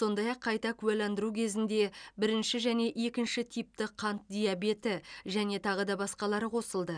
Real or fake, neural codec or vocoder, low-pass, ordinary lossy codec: real; none; 9.9 kHz; none